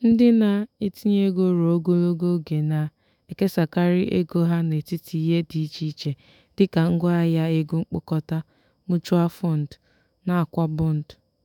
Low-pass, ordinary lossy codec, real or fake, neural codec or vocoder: 19.8 kHz; none; fake; autoencoder, 48 kHz, 128 numbers a frame, DAC-VAE, trained on Japanese speech